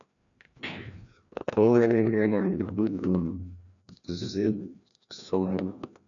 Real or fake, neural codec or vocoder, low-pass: fake; codec, 16 kHz, 1 kbps, FreqCodec, larger model; 7.2 kHz